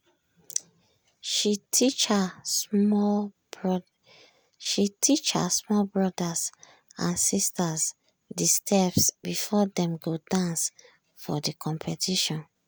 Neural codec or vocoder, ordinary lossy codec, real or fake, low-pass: none; none; real; none